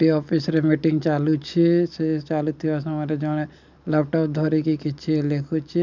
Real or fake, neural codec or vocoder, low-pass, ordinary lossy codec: real; none; 7.2 kHz; none